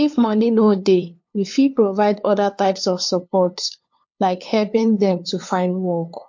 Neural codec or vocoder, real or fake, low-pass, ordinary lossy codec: codec, 16 kHz, 2 kbps, FunCodec, trained on LibriTTS, 25 frames a second; fake; 7.2 kHz; MP3, 48 kbps